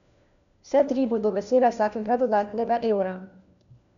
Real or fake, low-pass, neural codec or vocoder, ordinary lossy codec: fake; 7.2 kHz; codec, 16 kHz, 1 kbps, FunCodec, trained on LibriTTS, 50 frames a second; none